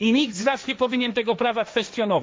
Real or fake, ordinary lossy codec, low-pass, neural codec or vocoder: fake; none; none; codec, 16 kHz, 1.1 kbps, Voila-Tokenizer